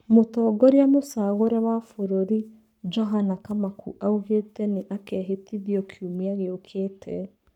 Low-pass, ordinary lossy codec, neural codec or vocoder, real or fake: 19.8 kHz; none; codec, 44.1 kHz, 7.8 kbps, Pupu-Codec; fake